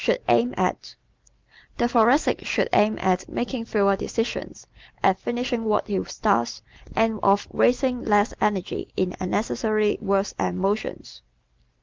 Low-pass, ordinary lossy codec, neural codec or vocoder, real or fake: 7.2 kHz; Opus, 32 kbps; none; real